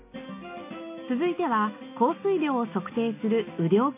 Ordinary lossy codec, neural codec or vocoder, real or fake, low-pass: MP3, 24 kbps; none; real; 3.6 kHz